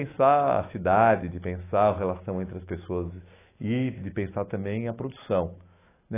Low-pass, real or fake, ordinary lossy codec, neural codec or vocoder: 3.6 kHz; fake; AAC, 16 kbps; autoencoder, 48 kHz, 128 numbers a frame, DAC-VAE, trained on Japanese speech